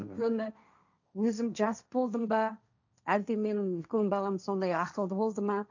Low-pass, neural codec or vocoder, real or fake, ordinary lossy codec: 7.2 kHz; codec, 16 kHz, 1.1 kbps, Voila-Tokenizer; fake; none